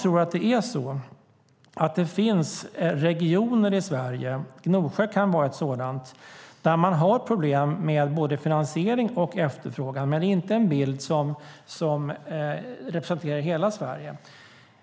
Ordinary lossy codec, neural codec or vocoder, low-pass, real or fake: none; none; none; real